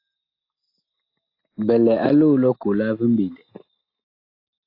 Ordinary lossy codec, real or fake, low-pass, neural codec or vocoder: AAC, 32 kbps; real; 5.4 kHz; none